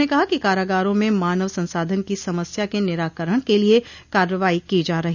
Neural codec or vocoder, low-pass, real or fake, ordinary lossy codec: none; 7.2 kHz; real; none